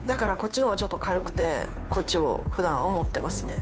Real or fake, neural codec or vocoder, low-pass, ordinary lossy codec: fake; codec, 16 kHz, 2 kbps, FunCodec, trained on Chinese and English, 25 frames a second; none; none